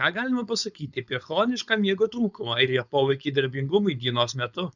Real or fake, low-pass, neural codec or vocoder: fake; 7.2 kHz; codec, 16 kHz, 4.8 kbps, FACodec